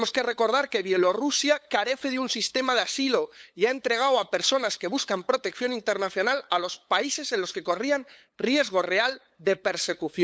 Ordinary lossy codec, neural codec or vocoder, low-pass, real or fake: none; codec, 16 kHz, 8 kbps, FunCodec, trained on LibriTTS, 25 frames a second; none; fake